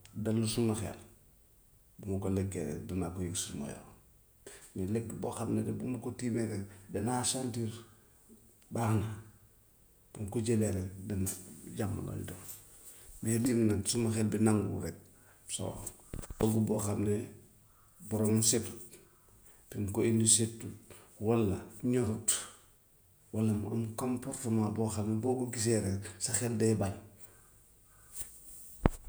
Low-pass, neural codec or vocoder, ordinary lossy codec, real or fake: none; vocoder, 48 kHz, 128 mel bands, Vocos; none; fake